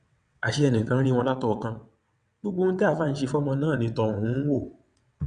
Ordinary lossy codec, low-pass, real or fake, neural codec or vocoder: none; 9.9 kHz; fake; vocoder, 22.05 kHz, 80 mel bands, WaveNeXt